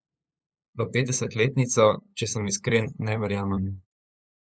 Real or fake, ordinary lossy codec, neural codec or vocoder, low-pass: fake; none; codec, 16 kHz, 8 kbps, FunCodec, trained on LibriTTS, 25 frames a second; none